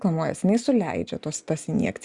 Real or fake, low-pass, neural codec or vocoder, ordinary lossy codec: real; 10.8 kHz; none; Opus, 64 kbps